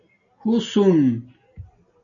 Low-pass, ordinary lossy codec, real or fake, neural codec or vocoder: 7.2 kHz; AAC, 64 kbps; real; none